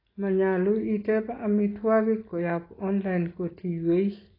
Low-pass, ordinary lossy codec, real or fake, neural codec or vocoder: 5.4 kHz; AAC, 24 kbps; real; none